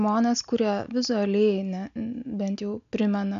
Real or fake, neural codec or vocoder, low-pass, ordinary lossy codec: real; none; 7.2 kHz; AAC, 96 kbps